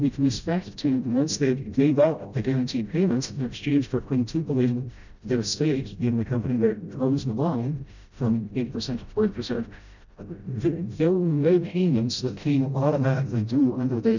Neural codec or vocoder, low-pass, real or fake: codec, 16 kHz, 0.5 kbps, FreqCodec, smaller model; 7.2 kHz; fake